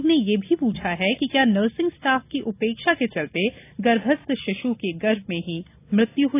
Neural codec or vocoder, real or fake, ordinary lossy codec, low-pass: none; real; AAC, 24 kbps; 3.6 kHz